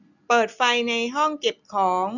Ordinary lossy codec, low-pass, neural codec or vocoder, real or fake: none; 7.2 kHz; none; real